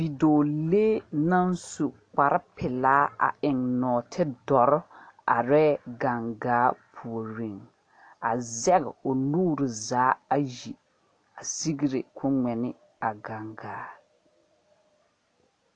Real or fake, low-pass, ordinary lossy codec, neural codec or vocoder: real; 9.9 kHz; AAC, 48 kbps; none